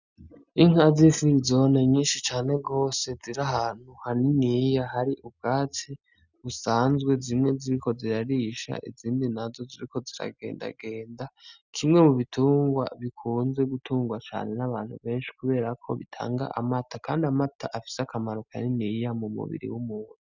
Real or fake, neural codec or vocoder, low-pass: real; none; 7.2 kHz